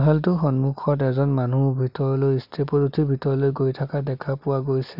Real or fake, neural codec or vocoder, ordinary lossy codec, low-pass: real; none; none; 5.4 kHz